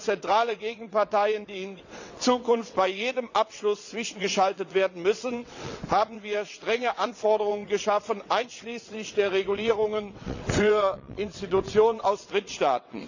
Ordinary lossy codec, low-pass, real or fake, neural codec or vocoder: none; 7.2 kHz; fake; vocoder, 22.05 kHz, 80 mel bands, WaveNeXt